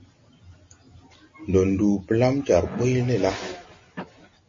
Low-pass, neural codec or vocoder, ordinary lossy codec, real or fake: 7.2 kHz; none; MP3, 32 kbps; real